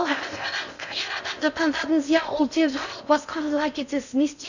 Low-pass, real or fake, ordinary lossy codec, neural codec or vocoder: 7.2 kHz; fake; none; codec, 16 kHz in and 24 kHz out, 0.6 kbps, FocalCodec, streaming, 2048 codes